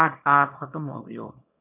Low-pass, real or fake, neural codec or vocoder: 3.6 kHz; fake; codec, 24 kHz, 0.9 kbps, WavTokenizer, small release